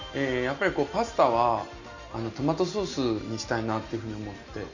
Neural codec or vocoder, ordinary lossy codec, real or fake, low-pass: none; none; real; 7.2 kHz